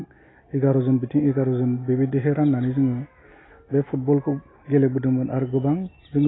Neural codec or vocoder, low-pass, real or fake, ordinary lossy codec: none; 7.2 kHz; real; AAC, 16 kbps